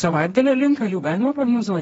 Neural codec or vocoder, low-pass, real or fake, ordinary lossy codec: codec, 24 kHz, 0.9 kbps, WavTokenizer, medium music audio release; 10.8 kHz; fake; AAC, 24 kbps